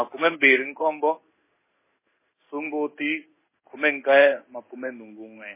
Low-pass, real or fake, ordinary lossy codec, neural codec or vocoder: 3.6 kHz; fake; MP3, 16 kbps; codec, 16 kHz in and 24 kHz out, 1 kbps, XY-Tokenizer